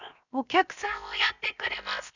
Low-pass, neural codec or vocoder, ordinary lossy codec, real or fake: 7.2 kHz; codec, 16 kHz, 0.7 kbps, FocalCodec; none; fake